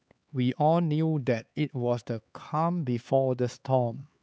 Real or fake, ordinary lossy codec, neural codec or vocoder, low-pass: fake; none; codec, 16 kHz, 4 kbps, X-Codec, HuBERT features, trained on LibriSpeech; none